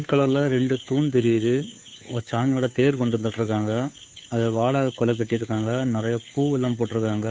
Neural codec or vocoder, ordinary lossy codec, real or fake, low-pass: codec, 16 kHz, 2 kbps, FunCodec, trained on Chinese and English, 25 frames a second; none; fake; none